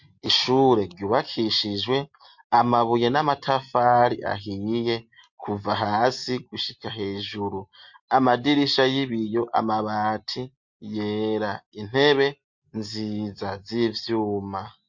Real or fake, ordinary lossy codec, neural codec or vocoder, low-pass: real; MP3, 48 kbps; none; 7.2 kHz